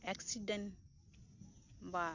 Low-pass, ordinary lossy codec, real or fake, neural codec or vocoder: 7.2 kHz; none; real; none